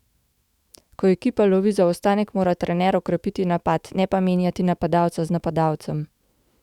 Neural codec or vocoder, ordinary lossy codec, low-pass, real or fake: autoencoder, 48 kHz, 128 numbers a frame, DAC-VAE, trained on Japanese speech; Opus, 64 kbps; 19.8 kHz; fake